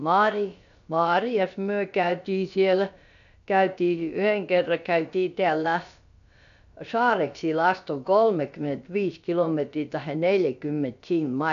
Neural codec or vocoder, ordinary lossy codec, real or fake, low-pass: codec, 16 kHz, about 1 kbps, DyCAST, with the encoder's durations; none; fake; 7.2 kHz